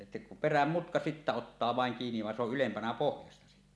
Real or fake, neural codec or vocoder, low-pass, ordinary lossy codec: real; none; none; none